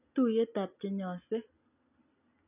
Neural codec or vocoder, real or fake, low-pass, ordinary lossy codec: none; real; 3.6 kHz; none